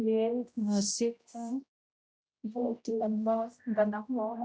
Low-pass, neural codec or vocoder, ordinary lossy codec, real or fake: none; codec, 16 kHz, 0.5 kbps, X-Codec, HuBERT features, trained on general audio; none; fake